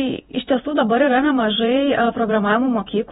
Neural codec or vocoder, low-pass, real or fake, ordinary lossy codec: vocoder, 48 kHz, 128 mel bands, Vocos; 19.8 kHz; fake; AAC, 16 kbps